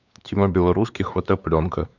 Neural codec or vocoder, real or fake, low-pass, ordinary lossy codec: codec, 16 kHz, 4 kbps, X-Codec, WavLM features, trained on Multilingual LibriSpeech; fake; 7.2 kHz; none